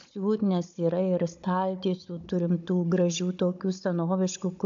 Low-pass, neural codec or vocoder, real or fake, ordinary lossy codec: 7.2 kHz; codec, 16 kHz, 16 kbps, FunCodec, trained on Chinese and English, 50 frames a second; fake; AAC, 64 kbps